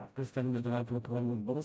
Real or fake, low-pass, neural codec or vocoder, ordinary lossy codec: fake; none; codec, 16 kHz, 0.5 kbps, FreqCodec, smaller model; none